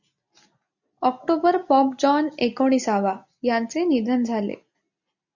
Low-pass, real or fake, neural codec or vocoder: 7.2 kHz; real; none